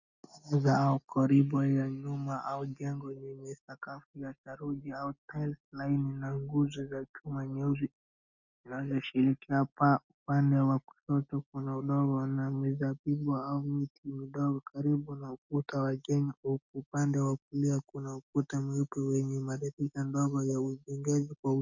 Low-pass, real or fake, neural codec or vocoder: 7.2 kHz; real; none